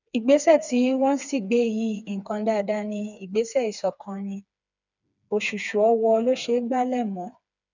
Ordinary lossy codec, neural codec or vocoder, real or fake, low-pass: none; codec, 16 kHz, 4 kbps, FreqCodec, smaller model; fake; 7.2 kHz